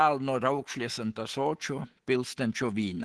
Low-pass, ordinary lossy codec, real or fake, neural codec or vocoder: 10.8 kHz; Opus, 16 kbps; fake; codec, 24 kHz, 3.1 kbps, DualCodec